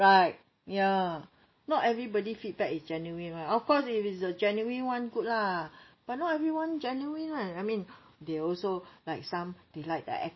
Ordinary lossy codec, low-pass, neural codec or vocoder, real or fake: MP3, 24 kbps; 7.2 kHz; none; real